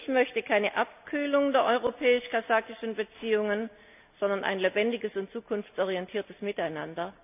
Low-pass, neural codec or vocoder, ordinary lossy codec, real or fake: 3.6 kHz; none; none; real